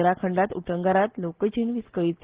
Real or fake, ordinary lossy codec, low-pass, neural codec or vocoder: real; Opus, 16 kbps; 3.6 kHz; none